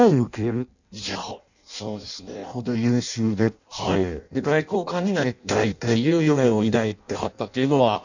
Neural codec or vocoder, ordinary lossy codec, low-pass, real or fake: codec, 16 kHz in and 24 kHz out, 0.6 kbps, FireRedTTS-2 codec; none; 7.2 kHz; fake